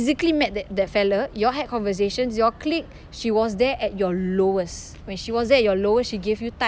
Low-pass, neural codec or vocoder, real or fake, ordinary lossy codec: none; none; real; none